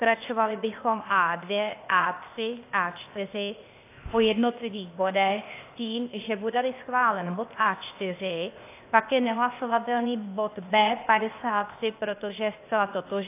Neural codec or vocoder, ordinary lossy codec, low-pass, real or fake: codec, 16 kHz, 0.8 kbps, ZipCodec; AAC, 24 kbps; 3.6 kHz; fake